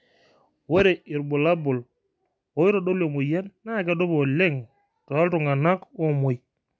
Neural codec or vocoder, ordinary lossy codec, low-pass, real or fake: none; none; none; real